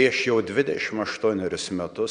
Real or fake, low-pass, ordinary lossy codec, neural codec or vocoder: real; 9.9 kHz; MP3, 96 kbps; none